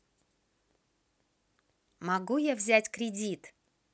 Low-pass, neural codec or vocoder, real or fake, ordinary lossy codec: none; none; real; none